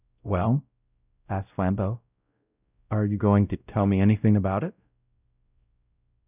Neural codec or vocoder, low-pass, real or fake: codec, 16 kHz, 0.5 kbps, X-Codec, WavLM features, trained on Multilingual LibriSpeech; 3.6 kHz; fake